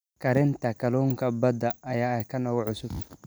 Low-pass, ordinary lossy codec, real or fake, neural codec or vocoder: none; none; real; none